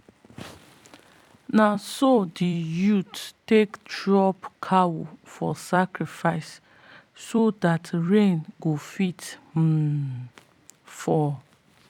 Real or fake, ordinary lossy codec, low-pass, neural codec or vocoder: fake; none; 19.8 kHz; vocoder, 44.1 kHz, 128 mel bands every 256 samples, BigVGAN v2